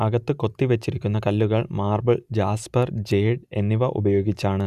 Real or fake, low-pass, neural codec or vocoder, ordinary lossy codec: real; 14.4 kHz; none; none